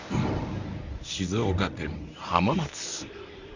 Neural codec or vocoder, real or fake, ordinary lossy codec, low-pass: codec, 24 kHz, 0.9 kbps, WavTokenizer, medium speech release version 1; fake; none; 7.2 kHz